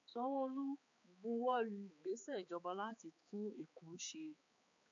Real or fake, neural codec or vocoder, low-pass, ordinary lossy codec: fake; codec, 16 kHz, 4 kbps, X-Codec, HuBERT features, trained on balanced general audio; 7.2 kHz; MP3, 48 kbps